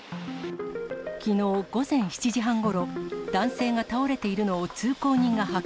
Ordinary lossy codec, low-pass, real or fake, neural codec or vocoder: none; none; real; none